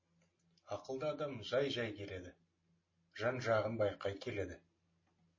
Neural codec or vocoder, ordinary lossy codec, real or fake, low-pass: none; MP3, 32 kbps; real; 7.2 kHz